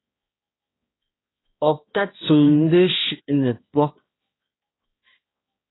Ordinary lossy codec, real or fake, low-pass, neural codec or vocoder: AAC, 16 kbps; fake; 7.2 kHz; codec, 16 kHz, 1 kbps, X-Codec, HuBERT features, trained on balanced general audio